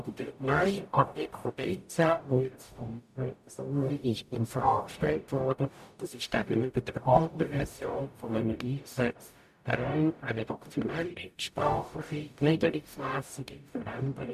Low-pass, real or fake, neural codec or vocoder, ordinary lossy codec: 14.4 kHz; fake; codec, 44.1 kHz, 0.9 kbps, DAC; none